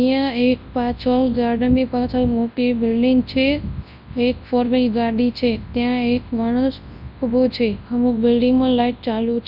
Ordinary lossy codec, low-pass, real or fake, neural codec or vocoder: none; 5.4 kHz; fake; codec, 24 kHz, 0.9 kbps, WavTokenizer, large speech release